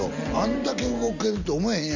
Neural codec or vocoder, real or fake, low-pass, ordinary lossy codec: none; real; 7.2 kHz; none